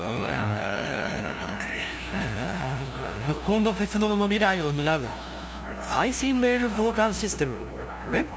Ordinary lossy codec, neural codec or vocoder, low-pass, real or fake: none; codec, 16 kHz, 0.5 kbps, FunCodec, trained on LibriTTS, 25 frames a second; none; fake